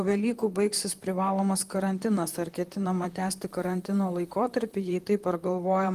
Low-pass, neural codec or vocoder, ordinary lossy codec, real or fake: 14.4 kHz; vocoder, 44.1 kHz, 128 mel bands, Pupu-Vocoder; Opus, 24 kbps; fake